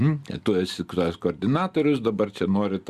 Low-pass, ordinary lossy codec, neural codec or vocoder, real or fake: 14.4 kHz; Opus, 64 kbps; none; real